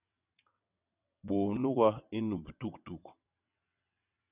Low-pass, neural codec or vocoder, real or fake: 3.6 kHz; vocoder, 22.05 kHz, 80 mel bands, WaveNeXt; fake